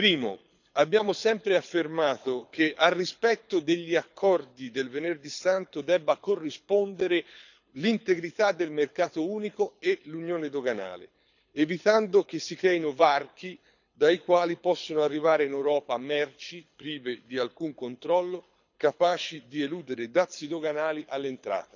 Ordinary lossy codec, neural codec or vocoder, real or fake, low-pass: none; codec, 24 kHz, 6 kbps, HILCodec; fake; 7.2 kHz